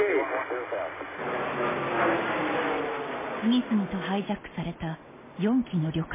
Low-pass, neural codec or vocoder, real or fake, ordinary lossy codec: 3.6 kHz; none; real; MP3, 16 kbps